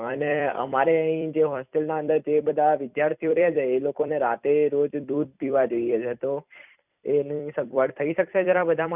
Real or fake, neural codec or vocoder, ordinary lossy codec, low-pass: fake; vocoder, 44.1 kHz, 128 mel bands every 256 samples, BigVGAN v2; none; 3.6 kHz